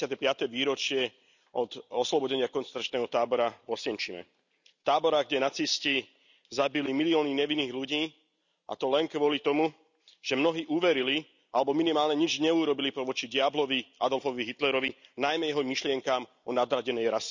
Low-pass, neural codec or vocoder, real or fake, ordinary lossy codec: 7.2 kHz; none; real; none